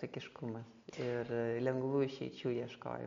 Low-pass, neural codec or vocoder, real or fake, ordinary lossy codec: 7.2 kHz; none; real; MP3, 48 kbps